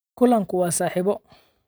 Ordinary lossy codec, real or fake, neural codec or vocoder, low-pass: none; real; none; none